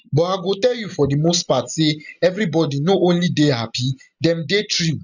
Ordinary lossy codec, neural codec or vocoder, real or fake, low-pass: none; none; real; 7.2 kHz